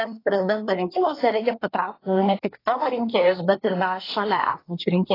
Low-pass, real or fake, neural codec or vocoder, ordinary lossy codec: 5.4 kHz; fake; codec, 24 kHz, 1 kbps, SNAC; AAC, 24 kbps